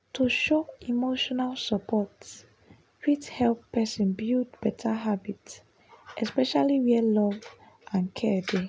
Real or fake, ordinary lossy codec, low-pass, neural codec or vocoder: real; none; none; none